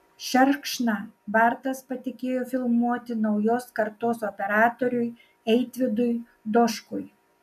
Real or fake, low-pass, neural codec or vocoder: real; 14.4 kHz; none